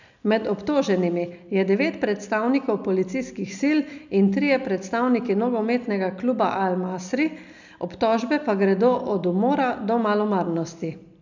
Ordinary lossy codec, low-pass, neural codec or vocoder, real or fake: none; 7.2 kHz; none; real